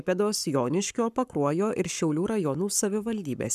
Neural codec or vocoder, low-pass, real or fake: codec, 44.1 kHz, 7.8 kbps, Pupu-Codec; 14.4 kHz; fake